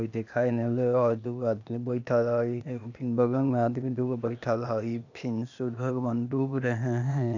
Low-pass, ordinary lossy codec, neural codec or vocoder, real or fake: 7.2 kHz; none; codec, 16 kHz, 0.8 kbps, ZipCodec; fake